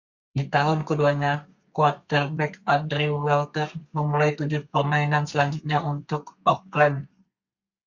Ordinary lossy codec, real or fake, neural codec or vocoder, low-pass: Opus, 64 kbps; fake; codec, 32 kHz, 1.9 kbps, SNAC; 7.2 kHz